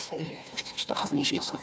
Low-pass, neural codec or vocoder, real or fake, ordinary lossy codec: none; codec, 16 kHz, 1 kbps, FunCodec, trained on Chinese and English, 50 frames a second; fake; none